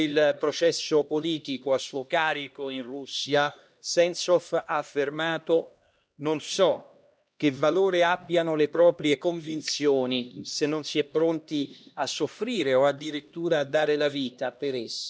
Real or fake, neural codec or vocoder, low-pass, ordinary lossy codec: fake; codec, 16 kHz, 1 kbps, X-Codec, HuBERT features, trained on LibriSpeech; none; none